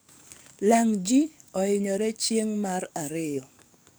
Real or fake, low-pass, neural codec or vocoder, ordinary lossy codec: fake; none; codec, 44.1 kHz, 7.8 kbps, DAC; none